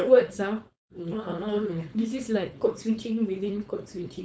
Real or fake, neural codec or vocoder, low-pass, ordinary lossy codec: fake; codec, 16 kHz, 4.8 kbps, FACodec; none; none